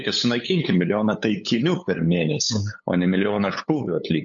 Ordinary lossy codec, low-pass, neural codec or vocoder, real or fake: MP3, 48 kbps; 7.2 kHz; codec, 16 kHz, 8 kbps, FunCodec, trained on LibriTTS, 25 frames a second; fake